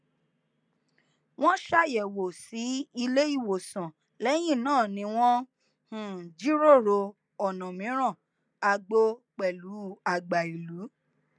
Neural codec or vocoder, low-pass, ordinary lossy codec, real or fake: none; none; none; real